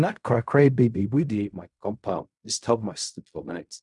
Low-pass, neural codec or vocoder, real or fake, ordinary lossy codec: 10.8 kHz; codec, 16 kHz in and 24 kHz out, 0.4 kbps, LongCat-Audio-Codec, fine tuned four codebook decoder; fake; none